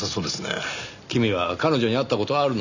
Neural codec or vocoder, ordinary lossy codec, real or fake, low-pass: none; none; real; 7.2 kHz